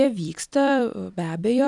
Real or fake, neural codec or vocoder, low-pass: fake; vocoder, 44.1 kHz, 128 mel bands every 256 samples, BigVGAN v2; 10.8 kHz